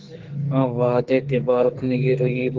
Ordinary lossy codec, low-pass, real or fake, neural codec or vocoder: Opus, 16 kbps; 7.2 kHz; fake; codec, 44.1 kHz, 2.6 kbps, SNAC